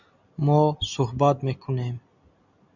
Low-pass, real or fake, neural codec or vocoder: 7.2 kHz; real; none